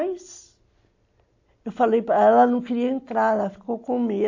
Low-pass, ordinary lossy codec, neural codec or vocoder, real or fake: 7.2 kHz; AAC, 48 kbps; none; real